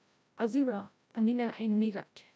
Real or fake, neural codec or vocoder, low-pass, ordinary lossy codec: fake; codec, 16 kHz, 0.5 kbps, FreqCodec, larger model; none; none